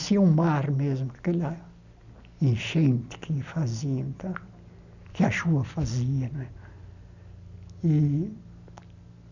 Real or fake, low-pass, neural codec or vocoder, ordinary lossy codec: real; 7.2 kHz; none; none